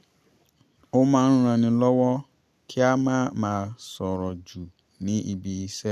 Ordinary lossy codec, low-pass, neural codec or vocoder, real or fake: none; 14.4 kHz; none; real